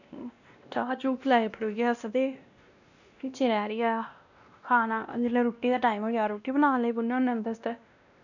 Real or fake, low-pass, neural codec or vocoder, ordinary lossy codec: fake; 7.2 kHz; codec, 16 kHz, 1 kbps, X-Codec, WavLM features, trained on Multilingual LibriSpeech; none